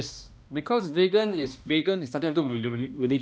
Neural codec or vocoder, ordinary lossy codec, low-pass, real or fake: codec, 16 kHz, 1 kbps, X-Codec, HuBERT features, trained on balanced general audio; none; none; fake